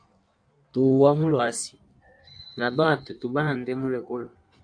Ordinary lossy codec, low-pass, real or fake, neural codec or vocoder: AAC, 64 kbps; 9.9 kHz; fake; codec, 16 kHz in and 24 kHz out, 1.1 kbps, FireRedTTS-2 codec